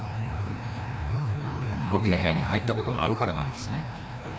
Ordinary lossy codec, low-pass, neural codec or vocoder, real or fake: none; none; codec, 16 kHz, 1 kbps, FreqCodec, larger model; fake